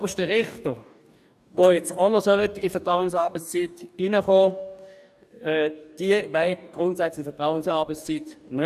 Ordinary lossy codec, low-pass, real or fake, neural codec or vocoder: none; 14.4 kHz; fake; codec, 44.1 kHz, 2.6 kbps, DAC